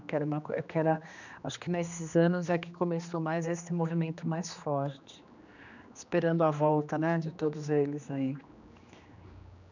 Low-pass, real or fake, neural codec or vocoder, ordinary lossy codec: 7.2 kHz; fake; codec, 16 kHz, 2 kbps, X-Codec, HuBERT features, trained on general audio; none